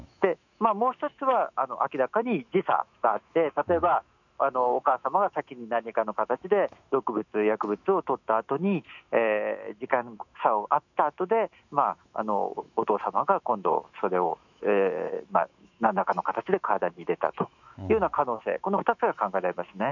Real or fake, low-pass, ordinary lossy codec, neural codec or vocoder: fake; 7.2 kHz; none; autoencoder, 48 kHz, 128 numbers a frame, DAC-VAE, trained on Japanese speech